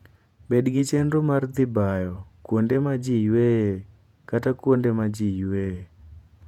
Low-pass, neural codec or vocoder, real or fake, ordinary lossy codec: 19.8 kHz; vocoder, 48 kHz, 128 mel bands, Vocos; fake; none